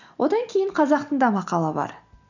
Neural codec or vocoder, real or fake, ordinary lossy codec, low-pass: none; real; none; 7.2 kHz